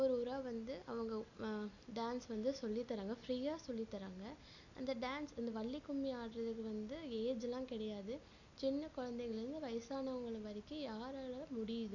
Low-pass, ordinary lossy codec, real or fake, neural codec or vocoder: 7.2 kHz; none; real; none